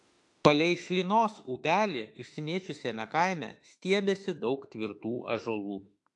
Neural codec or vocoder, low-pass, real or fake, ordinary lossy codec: autoencoder, 48 kHz, 32 numbers a frame, DAC-VAE, trained on Japanese speech; 10.8 kHz; fake; AAC, 64 kbps